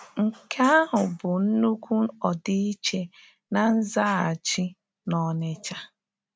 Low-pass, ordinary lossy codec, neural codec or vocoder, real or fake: none; none; none; real